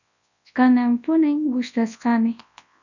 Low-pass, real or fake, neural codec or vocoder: 7.2 kHz; fake; codec, 24 kHz, 0.9 kbps, WavTokenizer, large speech release